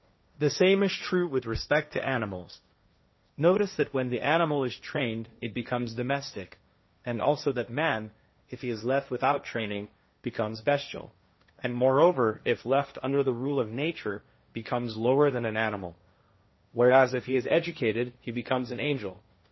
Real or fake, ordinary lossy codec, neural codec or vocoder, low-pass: fake; MP3, 24 kbps; codec, 16 kHz, 1.1 kbps, Voila-Tokenizer; 7.2 kHz